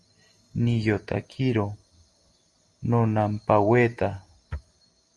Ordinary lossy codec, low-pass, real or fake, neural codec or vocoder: Opus, 32 kbps; 10.8 kHz; real; none